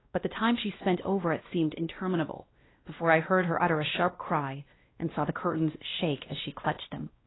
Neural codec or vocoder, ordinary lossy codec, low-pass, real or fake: codec, 16 kHz, 1 kbps, X-Codec, WavLM features, trained on Multilingual LibriSpeech; AAC, 16 kbps; 7.2 kHz; fake